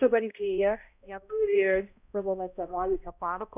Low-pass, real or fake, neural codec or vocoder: 3.6 kHz; fake; codec, 16 kHz, 0.5 kbps, X-Codec, HuBERT features, trained on balanced general audio